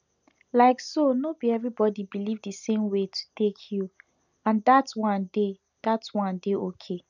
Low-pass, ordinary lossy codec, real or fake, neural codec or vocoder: 7.2 kHz; none; real; none